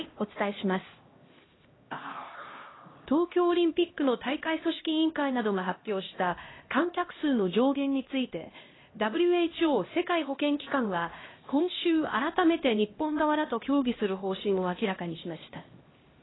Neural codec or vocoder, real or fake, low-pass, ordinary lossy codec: codec, 16 kHz, 1 kbps, X-Codec, HuBERT features, trained on LibriSpeech; fake; 7.2 kHz; AAC, 16 kbps